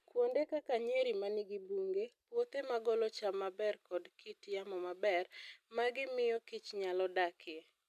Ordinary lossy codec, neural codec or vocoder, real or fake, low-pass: none; none; real; 14.4 kHz